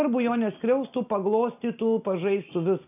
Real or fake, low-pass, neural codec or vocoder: real; 3.6 kHz; none